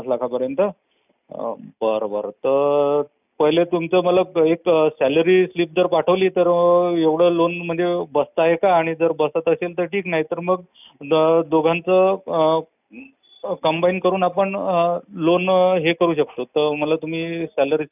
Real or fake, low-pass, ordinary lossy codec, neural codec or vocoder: real; 3.6 kHz; none; none